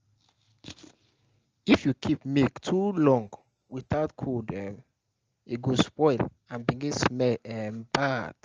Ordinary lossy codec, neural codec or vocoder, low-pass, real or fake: Opus, 24 kbps; none; 7.2 kHz; real